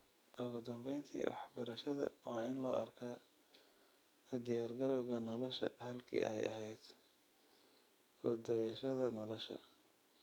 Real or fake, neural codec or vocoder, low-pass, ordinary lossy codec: fake; codec, 44.1 kHz, 2.6 kbps, SNAC; none; none